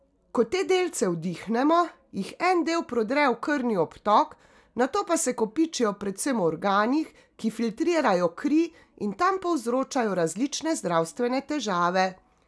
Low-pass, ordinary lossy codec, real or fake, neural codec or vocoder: none; none; real; none